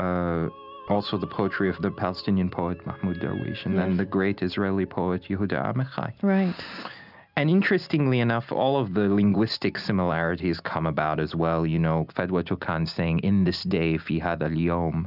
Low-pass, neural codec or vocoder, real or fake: 5.4 kHz; none; real